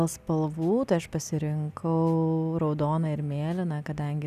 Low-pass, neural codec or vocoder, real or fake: 14.4 kHz; none; real